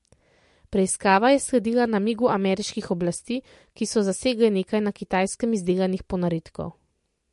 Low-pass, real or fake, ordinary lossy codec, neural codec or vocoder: 14.4 kHz; real; MP3, 48 kbps; none